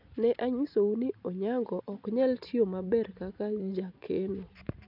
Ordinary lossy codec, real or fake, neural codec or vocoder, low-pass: none; real; none; 5.4 kHz